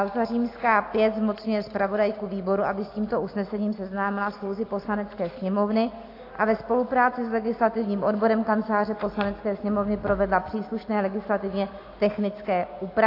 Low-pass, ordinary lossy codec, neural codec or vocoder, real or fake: 5.4 kHz; AAC, 32 kbps; none; real